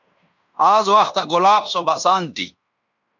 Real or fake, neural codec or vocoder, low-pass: fake; codec, 16 kHz in and 24 kHz out, 0.9 kbps, LongCat-Audio-Codec, fine tuned four codebook decoder; 7.2 kHz